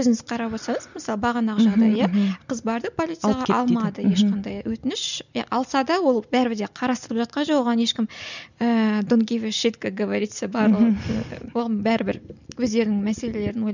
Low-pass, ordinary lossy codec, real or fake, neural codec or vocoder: 7.2 kHz; none; real; none